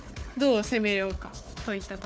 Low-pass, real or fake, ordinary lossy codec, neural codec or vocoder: none; fake; none; codec, 16 kHz, 4 kbps, FunCodec, trained on Chinese and English, 50 frames a second